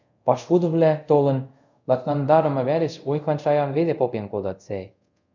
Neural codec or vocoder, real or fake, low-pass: codec, 24 kHz, 0.5 kbps, DualCodec; fake; 7.2 kHz